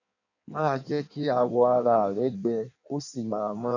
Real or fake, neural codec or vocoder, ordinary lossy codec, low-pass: fake; codec, 16 kHz in and 24 kHz out, 1.1 kbps, FireRedTTS-2 codec; none; 7.2 kHz